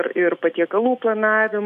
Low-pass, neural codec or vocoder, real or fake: 14.4 kHz; autoencoder, 48 kHz, 128 numbers a frame, DAC-VAE, trained on Japanese speech; fake